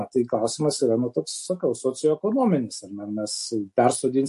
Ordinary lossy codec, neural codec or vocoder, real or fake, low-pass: MP3, 48 kbps; none; real; 14.4 kHz